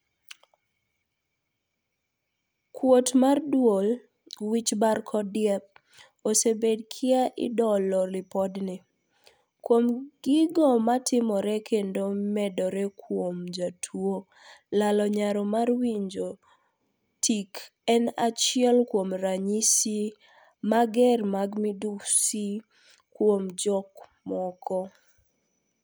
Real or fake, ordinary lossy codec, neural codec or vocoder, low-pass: real; none; none; none